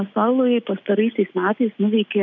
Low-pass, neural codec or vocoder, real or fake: 7.2 kHz; none; real